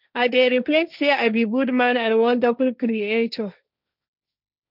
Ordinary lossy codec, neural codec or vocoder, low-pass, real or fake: none; codec, 16 kHz, 1.1 kbps, Voila-Tokenizer; 5.4 kHz; fake